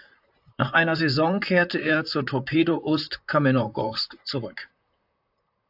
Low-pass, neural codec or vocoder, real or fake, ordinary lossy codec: 5.4 kHz; vocoder, 44.1 kHz, 128 mel bands, Pupu-Vocoder; fake; AAC, 48 kbps